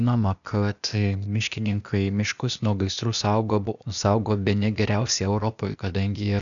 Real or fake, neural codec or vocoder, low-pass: fake; codec, 16 kHz, 0.8 kbps, ZipCodec; 7.2 kHz